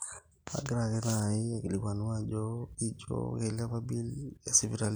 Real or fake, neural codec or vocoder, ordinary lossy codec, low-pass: real; none; none; none